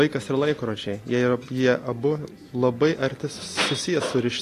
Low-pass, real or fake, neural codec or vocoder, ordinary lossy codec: 14.4 kHz; real; none; AAC, 48 kbps